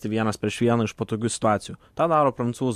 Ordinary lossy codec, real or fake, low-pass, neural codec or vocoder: MP3, 64 kbps; real; 14.4 kHz; none